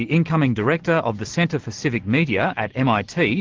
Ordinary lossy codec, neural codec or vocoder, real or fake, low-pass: Opus, 16 kbps; none; real; 7.2 kHz